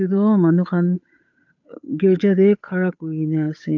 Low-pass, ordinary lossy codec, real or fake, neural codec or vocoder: 7.2 kHz; AAC, 48 kbps; fake; codec, 16 kHz, 8 kbps, FunCodec, trained on Chinese and English, 25 frames a second